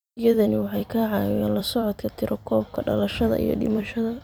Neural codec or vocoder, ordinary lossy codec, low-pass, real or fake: vocoder, 44.1 kHz, 128 mel bands every 256 samples, BigVGAN v2; none; none; fake